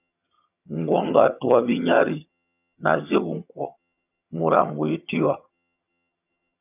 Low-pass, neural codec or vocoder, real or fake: 3.6 kHz; vocoder, 22.05 kHz, 80 mel bands, HiFi-GAN; fake